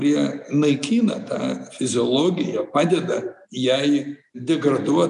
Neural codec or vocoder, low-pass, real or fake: none; 10.8 kHz; real